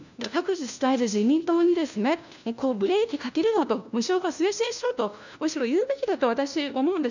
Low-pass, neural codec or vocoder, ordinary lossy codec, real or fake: 7.2 kHz; codec, 16 kHz, 1 kbps, FunCodec, trained on LibriTTS, 50 frames a second; none; fake